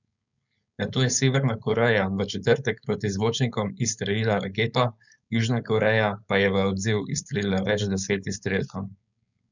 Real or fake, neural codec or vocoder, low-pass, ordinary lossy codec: fake; codec, 16 kHz, 4.8 kbps, FACodec; 7.2 kHz; none